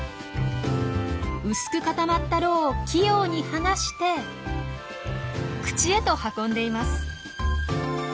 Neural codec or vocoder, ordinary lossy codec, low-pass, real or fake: none; none; none; real